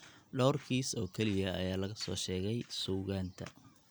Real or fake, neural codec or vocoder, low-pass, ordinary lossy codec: real; none; none; none